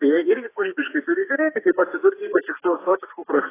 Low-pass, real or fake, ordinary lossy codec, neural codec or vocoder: 3.6 kHz; fake; AAC, 16 kbps; codec, 32 kHz, 1.9 kbps, SNAC